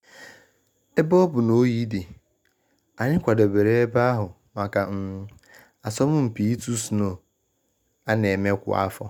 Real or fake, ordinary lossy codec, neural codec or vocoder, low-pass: real; none; none; 19.8 kHz